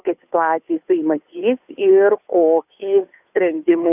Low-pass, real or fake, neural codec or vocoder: 3.6 kHz; fake; codec, 16 kHz, 2 kbps, FunCodec, trained on Chinese and English, 25 frames a second